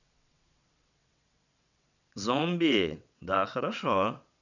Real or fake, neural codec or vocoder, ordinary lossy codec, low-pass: fake; vocoder, 22.05 kHz, 80 mel bands, WaveNeXt; none; 7.2 kHz